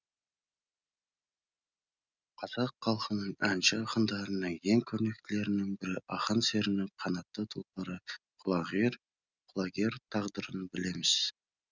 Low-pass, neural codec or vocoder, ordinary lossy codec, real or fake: 7.2 kHz; none; none; real